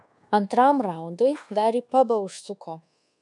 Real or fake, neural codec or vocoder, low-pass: fake; codec, 24 kHz, 1.2 kbps, DualCodec; 10.8 kHz